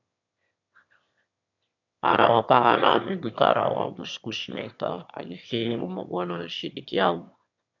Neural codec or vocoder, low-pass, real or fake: autoencoder, 22.05 kHz, a latent of 192 numbers a frame, VITS, trained on one speaker; 7.2 kHz; fake